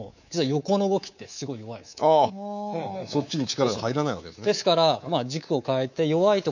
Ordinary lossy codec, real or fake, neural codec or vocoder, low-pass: none; fake; codec, 24 kHz, 3.1 kbps, DualCodec; 7.2 kHz